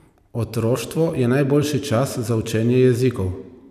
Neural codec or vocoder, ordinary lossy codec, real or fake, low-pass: vocoder, 44.1 kHz, 128 mel bands every 256 samples, BigVGAN v2; none; fake; 14.4 kHz